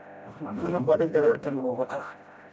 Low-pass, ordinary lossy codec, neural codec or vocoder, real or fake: none; none; codec, 16 kHz, 0.5 kbps, FreqCodec, smaller model; fake